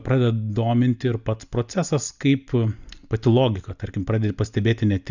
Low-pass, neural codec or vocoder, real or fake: 7.2 kHz; none; real